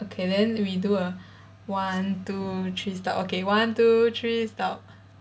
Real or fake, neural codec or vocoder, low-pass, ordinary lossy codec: real; none; none; none